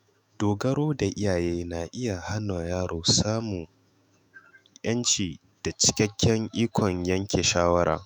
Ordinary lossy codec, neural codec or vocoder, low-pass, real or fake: none; autoencoder, 48 kHz, 128 numbers a frame, DAC-VAE, trained on Japanese speech; none; fake